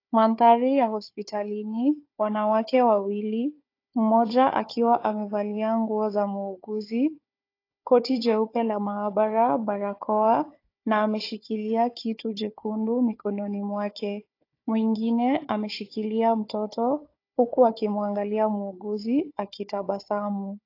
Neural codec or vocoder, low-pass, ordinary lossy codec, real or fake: codec, 16 kHz, 4 kbps, FunCodec, trained on Chinese and English, 50 frames a second; 5.4 kHz; AAC, 32 kbps; fake